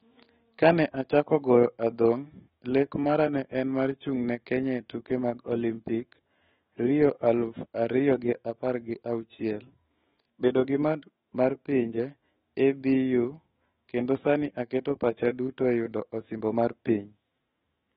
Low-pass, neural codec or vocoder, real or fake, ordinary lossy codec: 19.8 kHz; autoencoder, 48 kHz, 128 numbers a frame, DAC-VAE, trained on Japanese speech; fake; AAC, 16 kbps